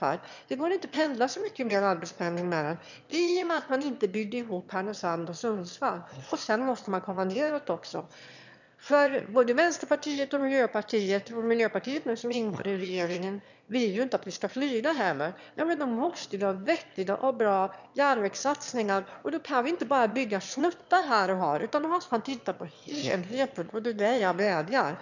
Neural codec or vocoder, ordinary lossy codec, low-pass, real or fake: autoencoder, 22.05 kHz, a latent of 192 numbers a frame, VITS, trained on one speaker; none; 7.2 kHz; fake